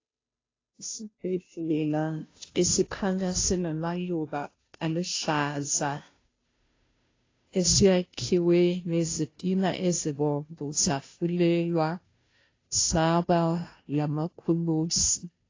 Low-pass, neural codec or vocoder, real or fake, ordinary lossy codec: 7.2 kHz; codec, 16 kHz, 0.5 kbps, FunCodec, trained on Chinese and English, 25 frames a second; fake; AAC, 32 kbps